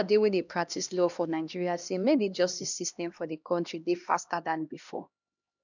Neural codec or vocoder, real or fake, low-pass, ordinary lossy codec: codec, 16 kHz, 1 kbps, X-Codec, HuBERT features, trained on LibriSpeech; fake; 7.2 kHz; none